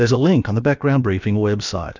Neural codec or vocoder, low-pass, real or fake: codec, 16 kHz, about 1 kbps, DyCAST, with the encoder's durations; 7.2 kHz; fake